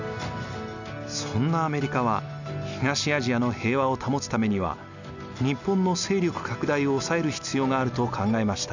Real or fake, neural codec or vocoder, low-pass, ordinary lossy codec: real; none; 7.2 kHz; none